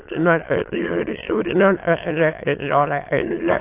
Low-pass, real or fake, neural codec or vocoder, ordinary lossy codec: 3.6 kHz; fake; autoencoder, 22.05 kHz, a latent of 192 numbers a frame, VITS, trained on many speakers; AAC, 24 kbps